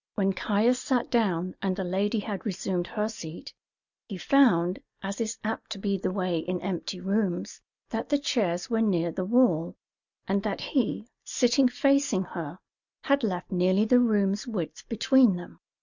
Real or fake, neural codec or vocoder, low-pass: real; none; 7.2 kHz